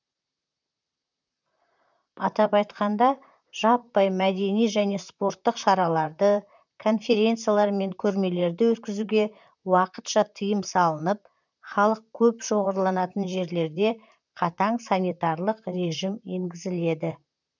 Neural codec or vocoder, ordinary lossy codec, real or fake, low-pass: vocoder, 44.1 kHz, 128 mel bands, Pupu-Vocoder; none; fake; 7.2 kHz